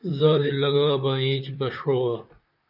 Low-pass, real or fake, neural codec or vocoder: 5.4 kHz; fake; vocoder, 44.1 kHz, 128 mel bands, Pupu-Vocoder